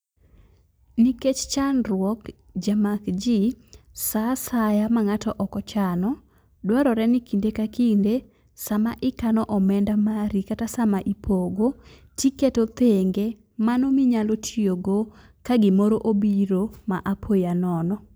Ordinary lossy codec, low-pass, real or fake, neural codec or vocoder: none; none; real; none